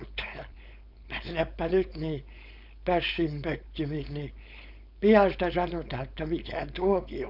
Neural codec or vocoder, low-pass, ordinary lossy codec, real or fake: codec, 16 kHz, 4.8 kbps, FACodec; 5.4 kHz; none; fake